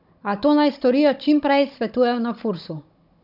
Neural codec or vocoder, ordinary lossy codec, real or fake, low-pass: codec, 16 kHz, 16 kbps, FunCodec, trained on Chinese and English, 50 frames a second; none; fake; 5.4 kHz